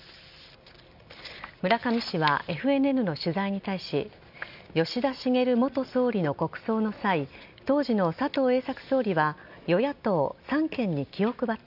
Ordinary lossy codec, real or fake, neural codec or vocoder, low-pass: none; real; none; 5.4 kHz